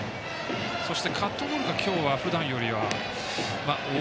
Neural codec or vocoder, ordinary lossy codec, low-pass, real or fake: none; none; none; real